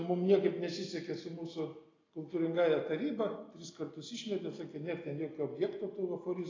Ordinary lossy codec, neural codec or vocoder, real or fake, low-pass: AAC, 32 kbps; none; real; 7.2 kHz